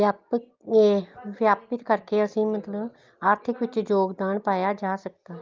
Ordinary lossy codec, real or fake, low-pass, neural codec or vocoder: Opus, 24 kbps; real; 7.2 kHz; none